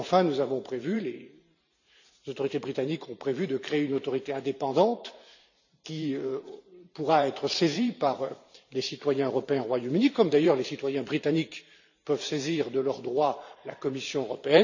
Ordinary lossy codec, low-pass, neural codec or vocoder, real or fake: none; 7.2 kHz; vocoder, 44.1 kHz, 128 mel bands every 512 samples, BigVGAN v2; fake